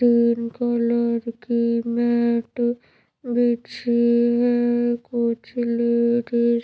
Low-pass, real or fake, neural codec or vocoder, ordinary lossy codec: none; real; none; none